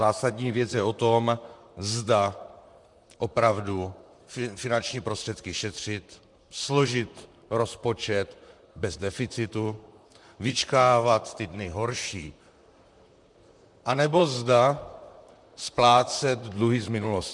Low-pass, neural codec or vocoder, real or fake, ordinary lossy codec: 10.8 kHz; vocoder, 44.1 kHz, 128 mel bands, Pupu-Vocoder; fake; MP3, 96 kbps